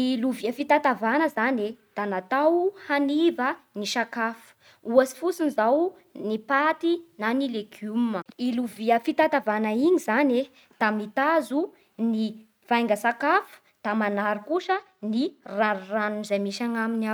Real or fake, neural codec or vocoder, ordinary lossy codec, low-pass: real; none; none; none